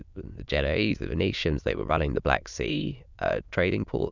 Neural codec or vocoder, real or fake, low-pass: autoencoder, 22.05 kHz, a latent of 192 numbers a frame, VITS, trained on many speakers; fake; 7.2 kHz